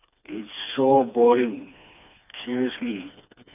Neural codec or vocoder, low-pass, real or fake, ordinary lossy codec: codec, 16 kHz, 2 kbps, FreqCodec, smaller model; 3.6 kHz; fake; none